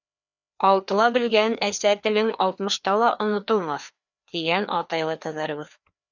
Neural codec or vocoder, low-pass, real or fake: codec, 16 kHz, 2 kbps, FreqCodec, larger model; 7.2 kHz; fake